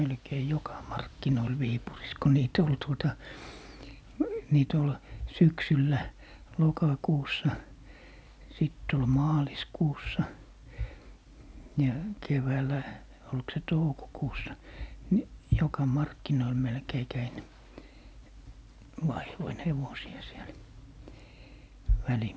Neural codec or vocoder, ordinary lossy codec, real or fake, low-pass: none; none; real; none